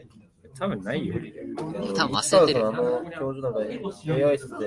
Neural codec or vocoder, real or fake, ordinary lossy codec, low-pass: none; real; Opus, 32 kbps; 10.8 kHz